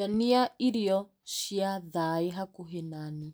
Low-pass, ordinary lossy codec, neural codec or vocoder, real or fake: none; none; none; real